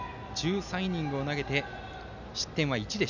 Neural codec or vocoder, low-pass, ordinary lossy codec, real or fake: none; 7.2 kHz; none; real